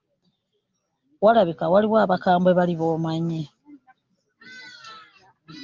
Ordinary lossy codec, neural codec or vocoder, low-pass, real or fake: Opus, 32 kbps; none; 7.2 kHz; real